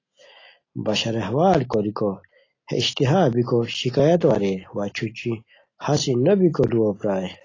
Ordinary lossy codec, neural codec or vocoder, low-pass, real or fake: AAC, 32 kbps; none; 7.2 kHz; real